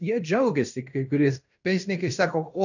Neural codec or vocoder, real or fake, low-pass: codec, 16 kHz in and 24 kHz out, 0.9 kbps, LongCat-Audio-Codec, fine tuned four codebook decoder; fake; 7.2 kHz